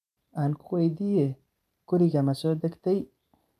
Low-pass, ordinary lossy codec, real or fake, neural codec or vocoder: 14.4 kHz; none; fake; vocoder, 44.1 kHz, 128 mel bands every 512 samples, BigVGAN v2